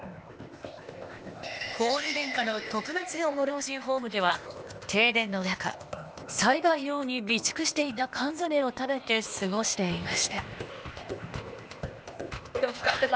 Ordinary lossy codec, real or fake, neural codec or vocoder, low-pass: none; fake; codec, 16 kHz, 0.8 kbps, ZipCodec; none